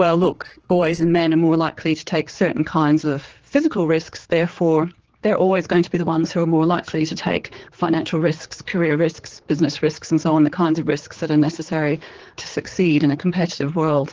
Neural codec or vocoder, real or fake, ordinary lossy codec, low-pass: codec, 16 kHz, 4 kbps, X-Codec, HuBERT features, trained on general audio; fake; Opus, 16 kbps; 7.2 kHz